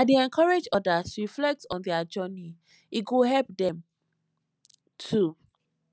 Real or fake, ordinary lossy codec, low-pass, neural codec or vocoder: real; none; none; none